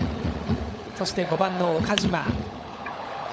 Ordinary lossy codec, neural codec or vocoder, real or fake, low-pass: none; codec, 16 kHz, 16 kbps, FunCodec, trained on LibriTTS, 50 frames a second; fake; none